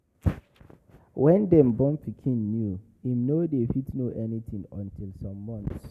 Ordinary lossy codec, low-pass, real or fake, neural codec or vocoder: none; 14.4 kHz; real; none